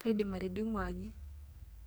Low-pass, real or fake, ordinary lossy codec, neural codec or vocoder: none; fake; none; codec, 44.1 kHz, 2.6 kbps, SNAC